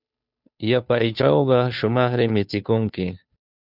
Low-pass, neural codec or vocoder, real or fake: 5.4 kHz; codec, 16 kHz, 2 kbps, FunCodec, trained on Chinese and English, 25 frames a second; fake